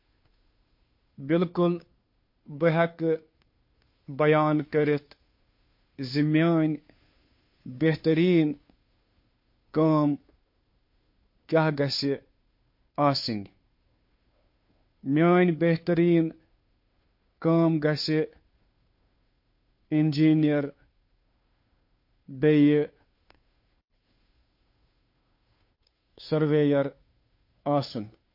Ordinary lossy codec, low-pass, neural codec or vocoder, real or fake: MP3, 32 kbps; 5.4 kHz; codec, 16 kHz, 2 kbps, FunCodec, trained on Chinese and English, 25 frames a second; fake